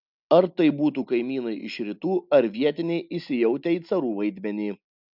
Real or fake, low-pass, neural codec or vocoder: real; 5.4 kHz; none